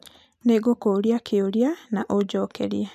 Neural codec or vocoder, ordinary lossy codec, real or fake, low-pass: none; none; real; 14.4 kHz